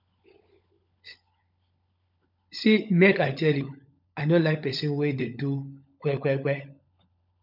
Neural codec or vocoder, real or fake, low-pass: codec, 16 kHz, 16 kbps, FunCodec, trained on LibriTTS, 50 frames a second; fake; 5.4 kHz